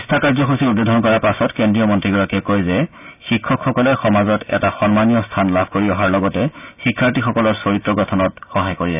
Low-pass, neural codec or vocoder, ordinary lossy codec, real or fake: 3.6 kHz; none; none; real